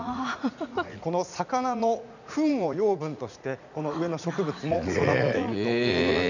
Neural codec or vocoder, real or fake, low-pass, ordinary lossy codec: vocoder, 44.1 kHz, 80 mel bands, Vocos; fake; 7.2 kHz; none